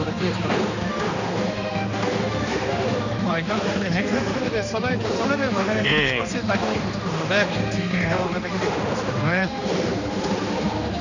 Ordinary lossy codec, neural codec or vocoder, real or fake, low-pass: none; codec, 16 kHz, 2 kbps, X-Codec, HuBERT features, trained on general audio; fake; 7.2 kHz